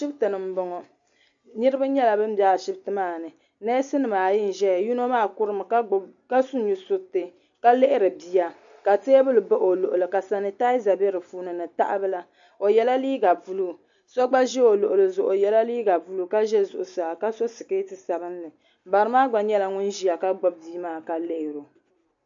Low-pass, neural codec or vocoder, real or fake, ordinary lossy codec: 7.2 kHz; none; real; AAC, 64 kbps